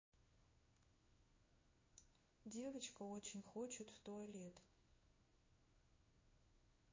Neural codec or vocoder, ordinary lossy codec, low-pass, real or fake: codec, 16 kHz in and 24 kHz out, 1 kbps, XY-Tokenizer; MP3, 32 kbps; 7.2 kHz; fake